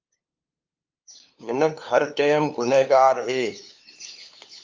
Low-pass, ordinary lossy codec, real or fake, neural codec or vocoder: 7.2 kHz; Opus, 24 kbps; fake; codec, 16 kHz, 2 kbps, FunCodec, trained on LibriTTS, 25 frames a second